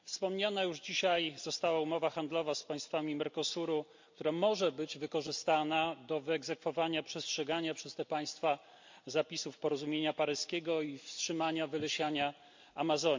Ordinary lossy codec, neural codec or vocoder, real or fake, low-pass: MP3, 48 kbps; none; real; 7.2 kHz